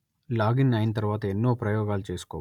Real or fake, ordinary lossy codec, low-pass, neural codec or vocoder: real; none; 19.8 kHz; none